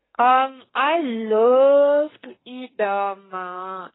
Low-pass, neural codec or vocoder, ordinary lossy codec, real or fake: 7.2 kHz; codec, 32 kHz, 1.9 kbps, SNAC; AAC, 16 kbps; fake